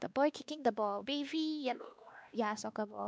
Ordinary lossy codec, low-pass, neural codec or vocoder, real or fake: none; none; codec, 16 kHz, 2 kbps, X-Codec, WavLM features, trained on Multilingual LibriSpeech; fake